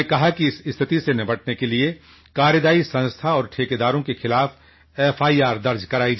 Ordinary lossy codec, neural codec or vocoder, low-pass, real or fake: MP3, 24 kbps; none; 7.2 kHz; real